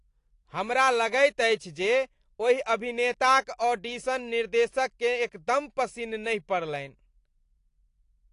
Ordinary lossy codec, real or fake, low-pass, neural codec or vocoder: AAC, 48 kbps; real; 10.8 kHz; none